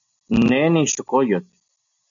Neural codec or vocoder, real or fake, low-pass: none; real; 7.2 kHz